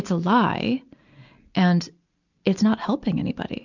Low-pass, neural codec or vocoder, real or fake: 7.2 kHz; none; real